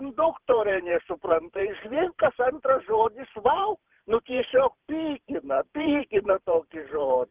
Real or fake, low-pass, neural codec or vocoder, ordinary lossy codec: fake; 3.6 kHz; vocoder, 44.1 kHz, 128 mel bands every 512 samples, BigVGAN v2; Opus, 32 kbps